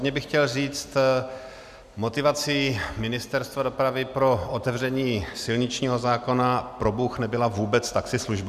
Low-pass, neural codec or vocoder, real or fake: 14.4 kHz; none; real